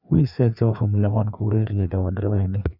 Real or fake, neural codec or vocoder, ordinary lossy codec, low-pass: fake; codec, 16 kHz, 2 kbps, FreqCodec, larger model; none; 5.4 kHz